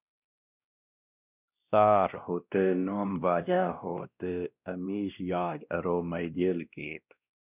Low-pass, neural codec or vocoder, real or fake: 3.6 kHz; codec, 16 kHz, 1 kbps, X-Codec, WavLM features, trained on Multilingual LibriSpeech; fake